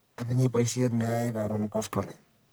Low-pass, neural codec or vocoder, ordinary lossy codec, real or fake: none; codec, 44.1 kHz, 1.7 kbps, Pupu-Codec; none; fake